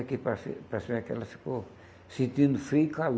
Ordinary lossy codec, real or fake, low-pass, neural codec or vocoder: none; real; none; none